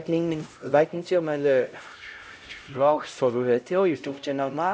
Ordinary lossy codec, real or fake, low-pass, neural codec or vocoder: none; fake; none; codec, 16 kHz, 0.5 kbps, X-Codec, HuBERT features, trained on LibriSpeech